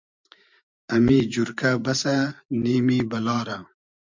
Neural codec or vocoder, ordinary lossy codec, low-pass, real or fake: vocoder, 44.1 kHz, 128 mel bands every 512 samples, BigVGAN v2; MP3, 64 kbps; 7.2 kHz; fake